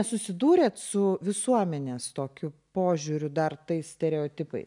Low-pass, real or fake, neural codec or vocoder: 10.8 kHz; real; none